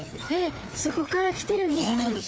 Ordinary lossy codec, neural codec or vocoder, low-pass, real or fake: none; codec, 16 kHz, 4 kbps, FreqCodec, larger model; none; fake